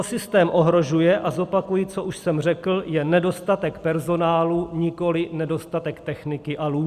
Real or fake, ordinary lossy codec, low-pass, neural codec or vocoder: real; Opus, 64 kbps; 14.4 kHz; none